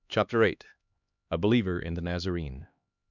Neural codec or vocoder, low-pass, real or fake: codec, 16 kHz, 2 kbps, X-Codec, HuBERT features, trained on LibriSpeech; 7.2 kHz; fake